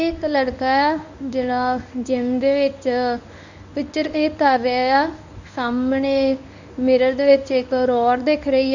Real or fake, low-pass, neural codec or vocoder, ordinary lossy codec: fake; 7.2 kHz; codec, 24 kHz, 0.9 kbps, WavTokenizer, medium speech release version 1; none